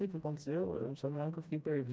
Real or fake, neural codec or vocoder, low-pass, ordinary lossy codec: fake; codec, 16 kHz, 1 kbps, FreqCodec, smaller model; none; none